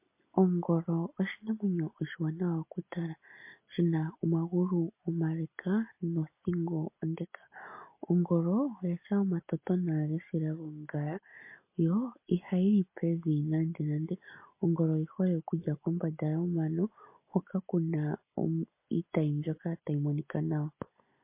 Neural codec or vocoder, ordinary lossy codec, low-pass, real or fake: none; MP3, 24 kbps; 3.6 kHz; real